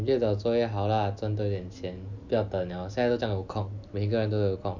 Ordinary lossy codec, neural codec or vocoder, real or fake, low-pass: none; none; real; 7.2 kHz